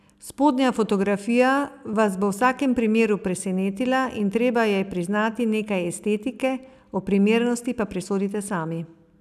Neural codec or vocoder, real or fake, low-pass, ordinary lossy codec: none; real; 14.4 kHz; none